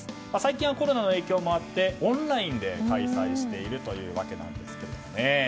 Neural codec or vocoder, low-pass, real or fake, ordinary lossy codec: none; none; real; none